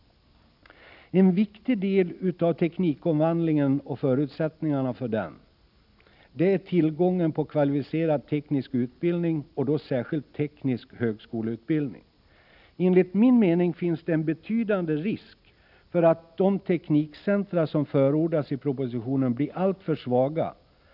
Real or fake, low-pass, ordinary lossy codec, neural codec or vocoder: real; 5.4 kHz; none; none